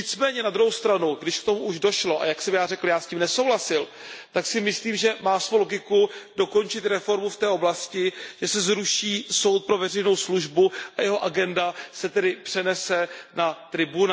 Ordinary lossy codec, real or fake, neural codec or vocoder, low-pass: none; real; none; none